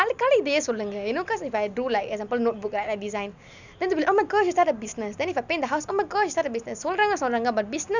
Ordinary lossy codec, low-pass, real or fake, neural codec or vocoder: none; 7.2 kHz; real; none